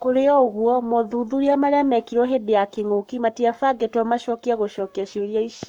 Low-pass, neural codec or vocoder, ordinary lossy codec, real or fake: 19.8 kHz; codec, 44.1 kHz, 7.8 kbps, Pupu-Codec; none; fake